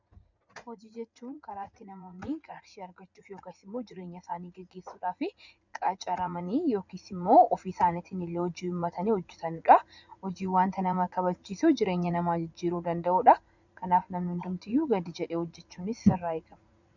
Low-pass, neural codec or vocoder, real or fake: 7.2 kHz; vocoder, 44.1 kHz, 80 mel bands, Vocos; fake